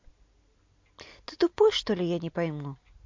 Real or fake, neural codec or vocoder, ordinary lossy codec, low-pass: real; none; MP3, 48 kbps; 7.2 kHz